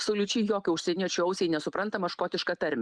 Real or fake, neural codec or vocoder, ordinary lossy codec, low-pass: real; none; Opus, 64 kbps; 9.9 kHz